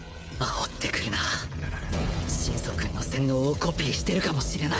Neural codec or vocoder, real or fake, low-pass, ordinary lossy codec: codec, 16 kHz, 16 kbps, FreqCodec, smaller model; fake; none; none